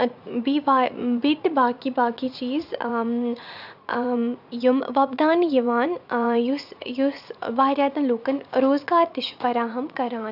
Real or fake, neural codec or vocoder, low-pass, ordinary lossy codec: fake; vocoder, 44.1 kHz, 80 mel bands, Vocos; 5.4 kHz; none